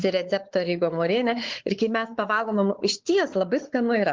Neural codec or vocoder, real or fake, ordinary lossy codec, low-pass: codec, 16 kHz, 4 kbps, X-Codec, WavLM features, trained on Multilingual LibriSpeech; fake; Opus, 16 kbps; 7.2 kHz